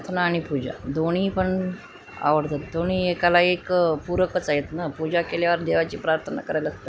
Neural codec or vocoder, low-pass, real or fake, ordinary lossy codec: none; none; real; none